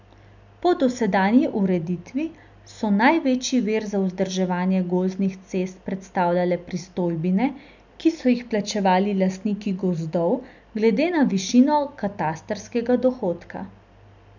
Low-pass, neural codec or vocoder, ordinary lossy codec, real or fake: 7.2 kHz; none; none; real